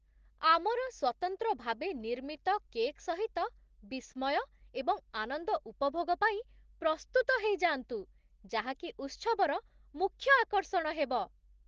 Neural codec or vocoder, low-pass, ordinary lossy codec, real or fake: none; 7.2 kHz; Opus, 16 kbps; real